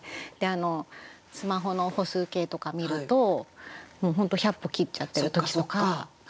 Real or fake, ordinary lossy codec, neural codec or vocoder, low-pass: real; none; none; none